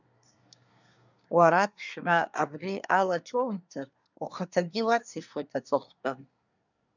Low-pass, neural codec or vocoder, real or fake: 7.2 kHz; codec, 24 kHz, 1 kbps, SNAC; fake